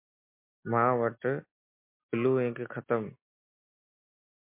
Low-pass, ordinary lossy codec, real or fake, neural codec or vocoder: 3.6 kHz; MP3, 32 kbps; real; none